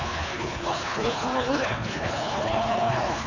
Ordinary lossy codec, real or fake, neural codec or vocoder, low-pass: none; fake; codec, 24 kHz, 3 kbps, HILCodec; 7.2 kHz